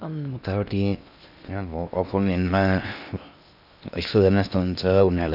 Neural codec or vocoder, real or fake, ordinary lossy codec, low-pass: codec, 16 kHz in and 24 kHz out, 0.6 kbps, FocalCodec, streaming, 2048 codes; fake; none; 5.4 kHz